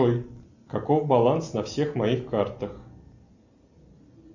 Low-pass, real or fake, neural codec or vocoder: 7.2 kHz; real; none